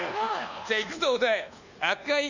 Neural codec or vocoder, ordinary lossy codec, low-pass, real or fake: codec, 24 kHz, 1.2 kbps, DualCodec; none; 7.2 kHz; fake